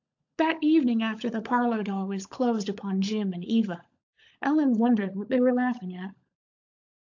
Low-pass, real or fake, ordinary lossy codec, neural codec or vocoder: 7.2 kHz; fake; AAC, 48 kbps; codec, 16 kHz, 16 kbps, FunCodec, trained on LibriTTS, 50 frames a second